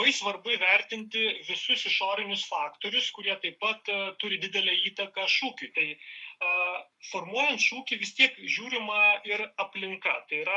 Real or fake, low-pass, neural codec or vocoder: fake; 10.8 kHz; codec, 44.1 kHz, 7.8 kbps, Pupu-Codec